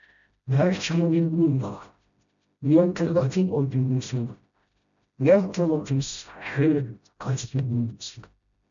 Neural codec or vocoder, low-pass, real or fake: codec, 16 kHz, 0.5 kbps, FreqCodec, smaller model; 7.2 kHz; fake